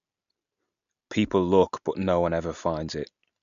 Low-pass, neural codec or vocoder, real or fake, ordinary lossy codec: 7.2 kHz; none; real; none